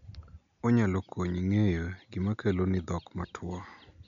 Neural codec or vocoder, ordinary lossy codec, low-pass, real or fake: none; none; 7.2 kHz; real